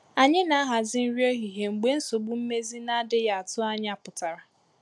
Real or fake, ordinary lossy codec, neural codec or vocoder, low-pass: real; none; none; none